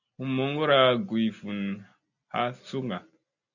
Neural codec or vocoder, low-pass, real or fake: none; 7.2 kHz; real